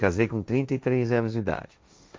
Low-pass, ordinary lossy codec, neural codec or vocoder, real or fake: none; none; codec, 16 kHz, 1.1 kbps, Voila-Tokenizer; fake